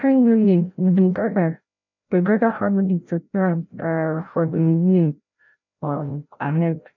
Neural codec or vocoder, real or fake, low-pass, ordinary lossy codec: codec, 16 kHz, 0.5 kbps, FreqCodec, larger model; fake; 7.2 kHz; none